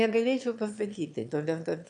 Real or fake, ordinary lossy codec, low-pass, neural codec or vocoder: fake; AAC, 64 kbps; 9.9 kHz; autoencoder, 22.05 kHz, a latent of 192 numbers a frame, VITS, trained on one speaker